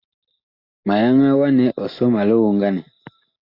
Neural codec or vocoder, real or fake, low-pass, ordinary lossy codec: none; real; 5.4 kHz; AAC, 32 kbps